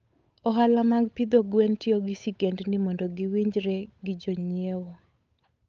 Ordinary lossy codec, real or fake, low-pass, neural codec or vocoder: Opus, 24 kbps; fake; 7.2 kHz; codec, 16 kHz, 8 kbps, FunCodec, trained on Chinese and English, 25 frames a second